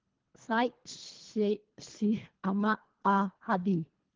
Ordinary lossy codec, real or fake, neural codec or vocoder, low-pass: Opus, 16 kbps; fake; codec, 24 kHz, 3 kbps, HILCodec; 7.2 kHz